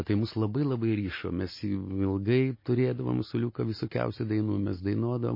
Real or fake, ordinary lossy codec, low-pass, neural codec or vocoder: real; MP3, 24 kbps; 5.4 kHz; none